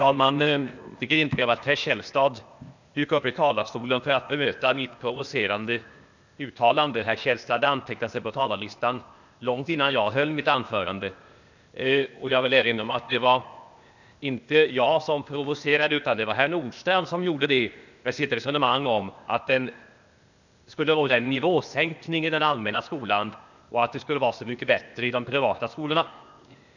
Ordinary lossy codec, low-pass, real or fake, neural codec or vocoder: none; 7.2 kHz; fake; codec, 16 kHz, 0.8 kbps, ZipCodec